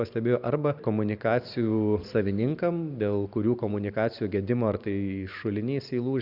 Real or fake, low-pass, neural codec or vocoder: fake; 5.4 kHz; vocoder, 44.1 kHz, 128 mel bands every 512 samples, BigVGAN v2